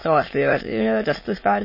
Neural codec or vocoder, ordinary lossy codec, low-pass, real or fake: autoencoder, 22.05 kHz, a latent of 192 numbers a frame, VITS, trained on many speakers; MP3, 24 kbps; 5.4 kHz; fake